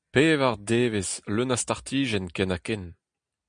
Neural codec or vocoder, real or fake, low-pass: none; real; 9.9 kHz